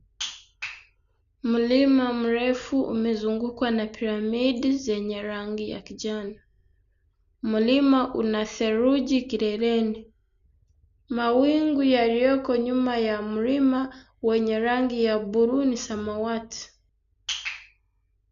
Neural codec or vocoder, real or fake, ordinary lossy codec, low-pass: none; real; none; 7.2 kHz